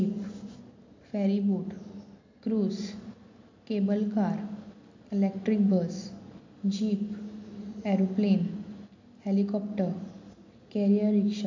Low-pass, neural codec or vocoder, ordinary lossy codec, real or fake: 7.2 kHz; none; AAC, 48 kbps; real